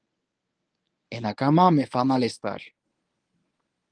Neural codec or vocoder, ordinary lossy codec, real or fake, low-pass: codec, 24 kHz, 0.9 kbps, WavTokenizer, medium speech release version 1; Opus, 24 kbps; fake; 9.9 kHz